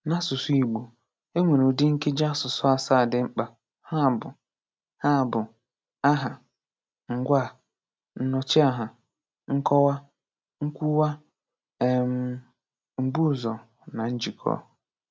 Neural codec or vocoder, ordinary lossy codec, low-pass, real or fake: none; none; none; real